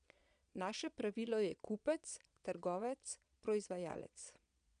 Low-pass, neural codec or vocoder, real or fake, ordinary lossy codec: 9.9 kHz; vocoder, 22.05 kHz, 80 mel bands, Vocos; fake; none